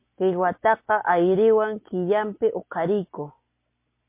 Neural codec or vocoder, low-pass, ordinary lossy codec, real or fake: none; 3.6 kHz; MP3, 24 kbps; real